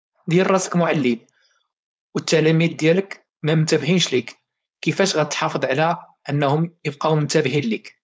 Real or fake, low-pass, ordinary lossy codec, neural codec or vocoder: fake; none; none; codec, 16 kHz, 4.8 kbps, FACodec